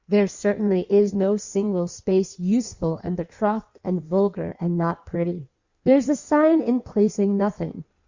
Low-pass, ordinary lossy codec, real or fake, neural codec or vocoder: 7.2 kHz; Opus, 64 kbps; fake; codec, 16 kHz in and 24 kHz out, 1.1 kbps, FireRedTTS-2 codec